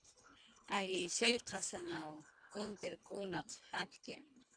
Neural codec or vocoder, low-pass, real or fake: codec, 24 kHz, 1.5 kbps, HILCodec; 9.9 kHz; fake